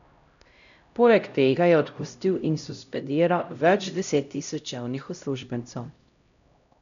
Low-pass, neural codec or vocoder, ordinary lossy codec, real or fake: 7.2 kHz; codec, 16 kHz, 0.5 kbps, X-Codec, HuBERT features, trained on LibriSpeech; none; fake